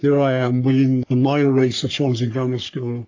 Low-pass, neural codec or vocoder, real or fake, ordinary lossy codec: 7.2 kHz; codec, 44.1 kHz, 3.4 kbps, Pupu-Codec; fake; AAC, 48 kbps